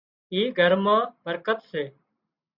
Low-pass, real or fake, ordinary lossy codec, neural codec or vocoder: 5.4 kHz; real; Opus, 64 kbps; none